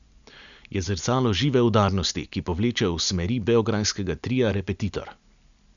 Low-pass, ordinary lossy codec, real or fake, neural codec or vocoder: 7.2 kHz; none; real; none